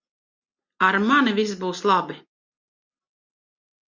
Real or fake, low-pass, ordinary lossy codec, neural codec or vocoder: real; 7.2 kHz; Opus, 64 kbps; none